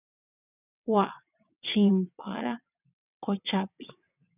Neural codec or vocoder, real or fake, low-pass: vocoder, 22.05 kHz, 80 mel bands, WaveNeXt; fake; 3.6 kHz